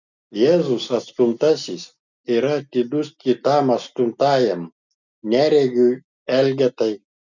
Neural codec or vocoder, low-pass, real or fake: none; 7.2 kHz; real